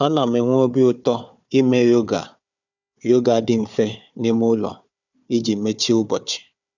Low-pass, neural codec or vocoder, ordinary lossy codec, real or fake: 7.2 kHz; codec, 16 kHz, 4 kbps, FunCodec, trained on Chinese and English, 50 frames a second; none; fake